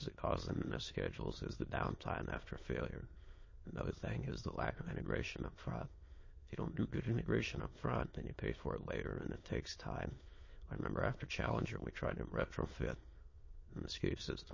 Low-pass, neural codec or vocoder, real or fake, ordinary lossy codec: 7.2 kHz; autoencoder, 22.05 kHz, a latent of 192 numbers a frame, VITS, trained on many speakers; fake; MP3, 32 kbps